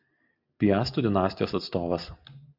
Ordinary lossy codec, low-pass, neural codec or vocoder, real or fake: MP3, 48 kbps; 5.4 kHz; none; real